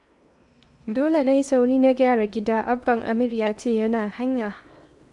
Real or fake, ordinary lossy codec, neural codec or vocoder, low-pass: fake; none; codec, 16 kHz in and 24 kHz out, 0.8 kbps, FocalCodec, streaming, 65536 codes; 10.8 kHz